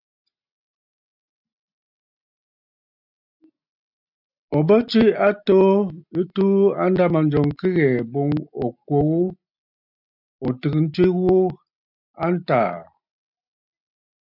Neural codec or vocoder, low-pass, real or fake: none; 5.4 kHz; real